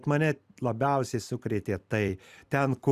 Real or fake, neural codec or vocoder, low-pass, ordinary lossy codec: fake; vocoder, 44.1 kHz, 128 mel bands every 256 samples, BigVGAN v2; 14.4 kHz; Opus, 64 kbps